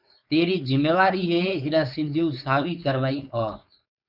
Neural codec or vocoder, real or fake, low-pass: codec, 16 kHz, 4.8 kbps, FACodec; fake; 5.4 kHz